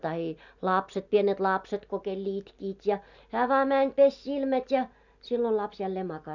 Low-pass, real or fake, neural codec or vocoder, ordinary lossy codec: 7.2 kHz; real; none; none